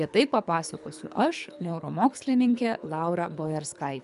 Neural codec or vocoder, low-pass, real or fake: codec, 24 kHz, 3 kbps, HILCodec; 10.8 kHz; fake